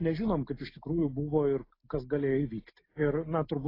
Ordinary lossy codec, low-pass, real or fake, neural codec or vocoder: AAC, 24 kbps; 5.4 kHz; real; none